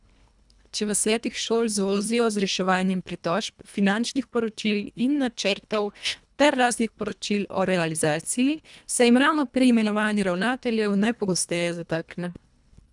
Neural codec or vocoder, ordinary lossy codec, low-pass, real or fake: codec, 24 kHz, 1.5 kbps, HILCodec; none; 10.8 kHz; fake